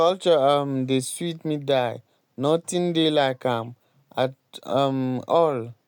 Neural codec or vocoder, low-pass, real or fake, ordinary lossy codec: none; 19.8 kHz; real; none